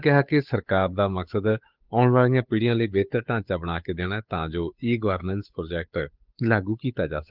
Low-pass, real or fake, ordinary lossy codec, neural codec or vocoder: 5.4 kHz; fake; Opus, 24 kbps; codec, 16 kHz, 8 kbps, FunCodec, trained on Chinese and English, 25 frames a second